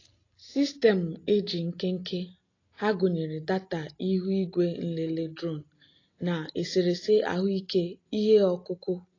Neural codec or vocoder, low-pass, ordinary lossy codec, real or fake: none; 7.2 kHz; AAC, 32 kbps; real